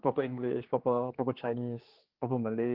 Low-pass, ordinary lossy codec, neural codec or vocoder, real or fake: 5.4 kHz; Opus, 16 kbps; codec, 16 kHz, 2 kbps, X-Codec, HuBERT features, trained on general audio; fake